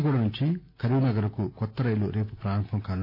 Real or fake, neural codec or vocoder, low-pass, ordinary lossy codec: real; none; 5.4 kHz; AAC, 32 kbps